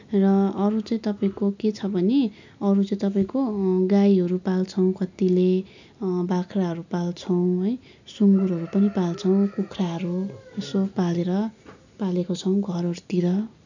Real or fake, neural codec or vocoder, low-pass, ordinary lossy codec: real; none; 7.2 kHz; none